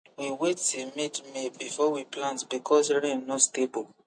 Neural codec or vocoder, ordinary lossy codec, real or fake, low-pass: none; MP3, 48 kbps; real; 9.9 kHz